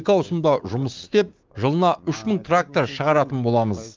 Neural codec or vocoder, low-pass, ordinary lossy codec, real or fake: codec, 16 kHz, 6 kbps, DAC; 7.2 kHz; Opus, 24 kbps; fake